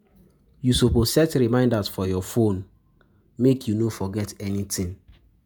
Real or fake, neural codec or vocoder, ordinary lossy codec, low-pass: fake; vocoder, 48 kHz, 128 mel bands, Vocos; none; none